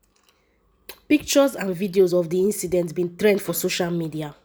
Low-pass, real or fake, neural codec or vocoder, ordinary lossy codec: none; real; none; none